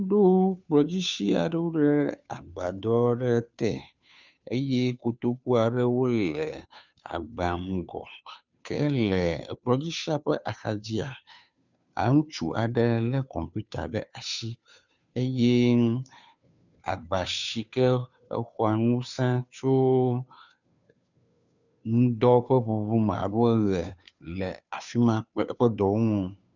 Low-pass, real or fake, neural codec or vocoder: 7.2 kHz; fake; codec, 16 kHz, 2 kbps, FunCodec, trained on Chinese and English, 25 frames a second